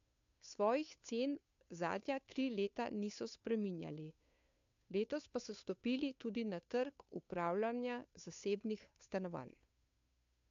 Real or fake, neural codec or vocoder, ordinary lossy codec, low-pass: fake; codec, 16 kHz, 2 kbps, FunCodec, trained on Chinese and English, 25 frames a second; AAC, 64 kbps; 7.2 kHz